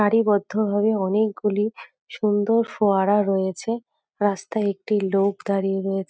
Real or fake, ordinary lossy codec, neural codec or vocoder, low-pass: real; none; none; none